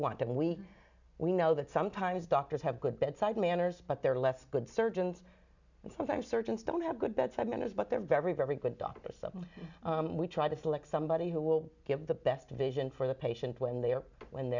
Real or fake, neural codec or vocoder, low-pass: real; none; 7.2 kHz